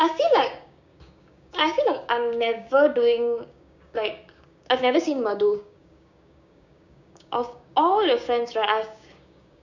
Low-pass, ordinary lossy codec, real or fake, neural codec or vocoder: 7.2 kHz; none; fake; codec, 16 kHz, 6 kbps, DAC